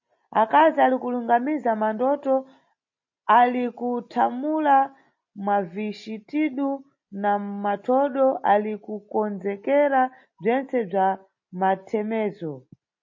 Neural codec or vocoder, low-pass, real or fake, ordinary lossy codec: none; 7.2 kHz; real; MP3, 32 kbps